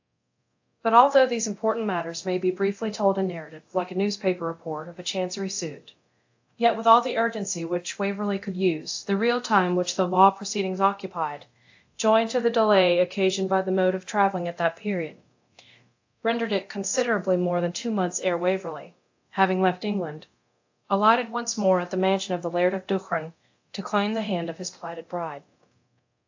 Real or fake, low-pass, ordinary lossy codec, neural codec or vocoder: fake; 7.2 kHz; AAC, 48 kbps; codec, 24 kHz, 0.9 kbps, DualCodec